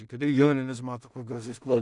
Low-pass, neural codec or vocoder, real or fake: 10.8 kHz; codec, 16 kHz in and 24 kHz out, 0.4 kbps, LongCat-Audio-Codec, two codebook decoder; fake